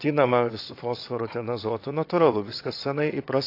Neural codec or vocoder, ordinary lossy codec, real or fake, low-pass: vocoder, 22.05 kHz, 80 mel bands, WaveNeXt; AAC, 32 kbps; fake; 5.4 kHz